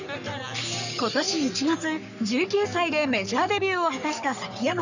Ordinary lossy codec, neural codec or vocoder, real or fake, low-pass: none; codec, 44.1 kHz, 3.4 kbps, Pupu-Codec; fake; 7.2 kHz